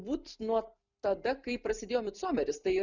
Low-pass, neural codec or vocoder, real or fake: 7.2 kHz; none; real